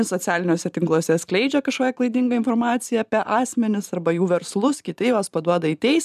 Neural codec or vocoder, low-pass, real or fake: vocoder, 44.1 kHz, 128 mel bands every 512 samples, BigVGAN v2; 14.4 kHz; fake